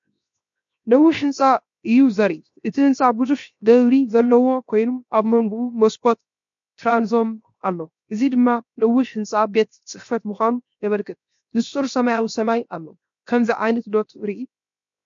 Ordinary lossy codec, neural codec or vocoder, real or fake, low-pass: MP3, 48 kbps; codec, 16 kHz, 0.7 kbps, FocalCodec; fake; 7.2 kHz